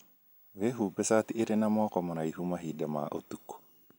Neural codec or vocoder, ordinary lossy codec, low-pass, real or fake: none; none; none; real